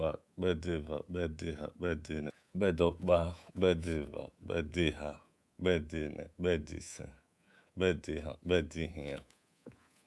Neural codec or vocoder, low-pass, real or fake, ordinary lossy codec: codec, 24 kHz, 3.1 kbps, DualCodec; none; fake; none